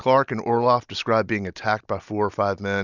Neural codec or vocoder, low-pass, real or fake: none; 7.2 kHz; real